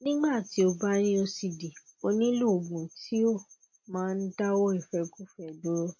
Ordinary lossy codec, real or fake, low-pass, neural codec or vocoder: MP3, 32 kbps; real; 7.2 kHz; none